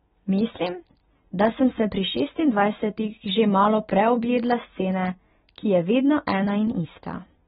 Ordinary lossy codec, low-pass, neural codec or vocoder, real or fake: AAC, 16 kbps; 19.8 kHz; none; real